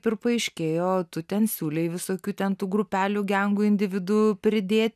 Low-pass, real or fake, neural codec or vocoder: 14.4 kHz; real; none